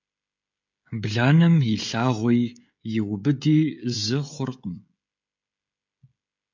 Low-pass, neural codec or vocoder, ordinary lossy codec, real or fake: 7.2 kHz; codec, 16 kHz, 16 kbps, FreqCodec, smaller model; MP3, 64 kbps; fake